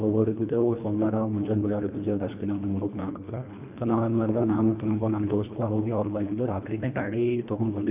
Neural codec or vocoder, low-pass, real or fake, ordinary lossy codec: codec, 24 kHz, 1.5 kbps, HILCodec; 3.6 kHz; fake; none